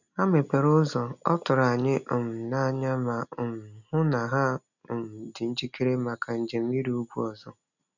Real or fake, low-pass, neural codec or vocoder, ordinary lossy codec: real; 7.2 kHz; none; none